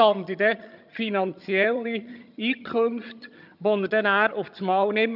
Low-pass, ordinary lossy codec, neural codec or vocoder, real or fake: 5.4 kHz; none; vocoder, 22.05 kHz, 80 mel bands, HiFi-GAN; fake